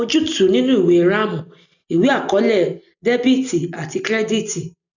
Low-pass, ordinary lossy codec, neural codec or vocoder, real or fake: 7.2 kHz; none; none; real